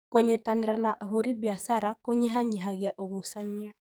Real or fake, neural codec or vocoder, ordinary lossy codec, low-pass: fake; codec, 44.1 kHz, 2.6 kbps, SNAC; none; none